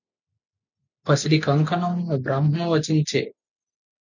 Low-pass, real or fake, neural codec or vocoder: 7.2 kHz; real; none